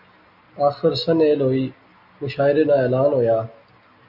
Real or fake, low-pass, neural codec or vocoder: real; 5.4 kHz; none